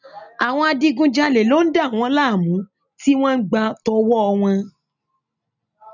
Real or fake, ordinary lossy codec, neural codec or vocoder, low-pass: real; none; none; 7.2 kHz